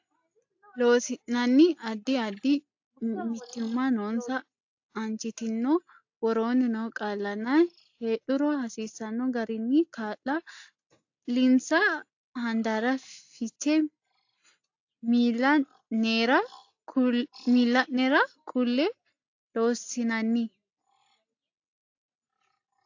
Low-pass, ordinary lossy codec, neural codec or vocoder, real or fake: 7.2 kHz; AAC, 48 kbps; none; real